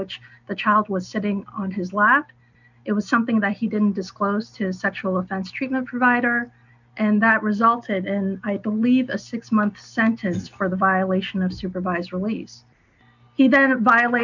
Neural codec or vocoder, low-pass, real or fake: none; 7.2 kHz; real